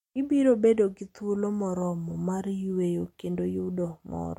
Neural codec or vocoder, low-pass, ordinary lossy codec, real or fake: none; 19.8 kHz; MP3, 64 kbps; real